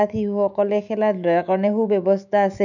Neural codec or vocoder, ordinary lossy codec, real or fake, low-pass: autoencoder, 48 kHz, 128 numbers a frame, DAC-VAE, trained on Japanese speech; none; fake; 7.2 kHz